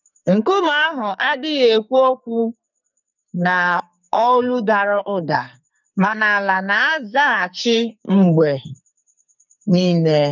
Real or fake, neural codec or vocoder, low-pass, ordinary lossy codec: fake; codec, 44.1 kHz, 2.6 kbps, SNAC; 7.2 kHz; none